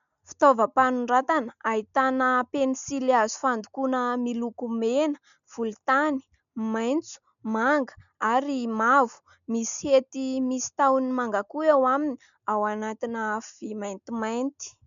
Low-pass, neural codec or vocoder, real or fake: 7.2 kHz; none; real